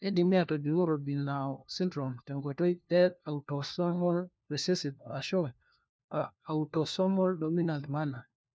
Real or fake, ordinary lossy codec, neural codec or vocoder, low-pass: fake; none; codec, 16 kHz, 1 kbps, FunCodec, trained on LibriTTS, 50 frames a second; none